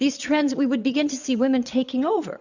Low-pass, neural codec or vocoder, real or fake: 7.2 kHz; vocoder, 22.05 kHz, 80 mel bands, Vocos; fake